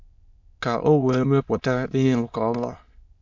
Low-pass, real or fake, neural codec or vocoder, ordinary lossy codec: 7.2 kHz; fake; autoencoder, 22.05 kHz, a latent of 192 numbers a frame, VITS, trained on many speakers; MP3, 48 kbps